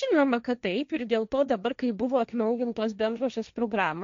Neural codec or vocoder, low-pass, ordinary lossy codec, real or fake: codec, 16 kHz, 1.1 kbps, Voila-Tokenizer; 7.2 kHz; MP3, 64 kbps; fake